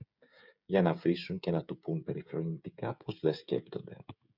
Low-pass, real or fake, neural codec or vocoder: 5.4 kHz; fake; codec, 16 kHz, 16 kbps, FreqCodec, smaller model